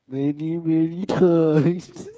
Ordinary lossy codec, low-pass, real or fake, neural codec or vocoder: none; none; fake; codec, 16 kHz, 8 kbps, FreqCodec, smaller model